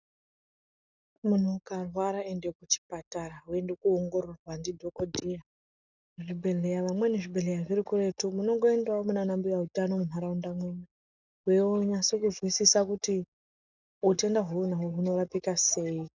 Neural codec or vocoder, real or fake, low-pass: none; real; 7.2 kHz